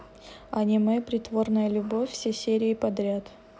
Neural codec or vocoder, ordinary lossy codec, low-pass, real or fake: none; none; none; real